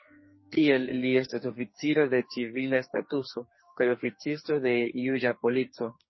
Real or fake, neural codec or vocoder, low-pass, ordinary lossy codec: fake; codec, 44.1 kHz, 2.6 kbps, SNAC; 7.2 kHz; MP3, 24 kbps